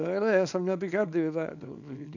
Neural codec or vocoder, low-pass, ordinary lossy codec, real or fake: codec, 24 kHz, 0.9 kbps, WavTokenizer, small release; 7.2 kHz; none; fake